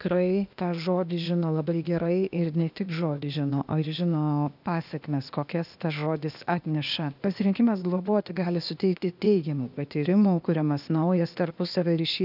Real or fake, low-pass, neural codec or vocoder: fake; 5.4 kHz; codec, 16 kHz, 0.8 kbps, ZipCodec